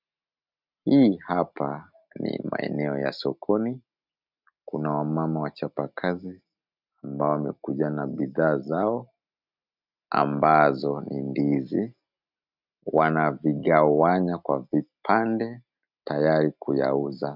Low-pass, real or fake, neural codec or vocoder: 5.4 kHz; real; none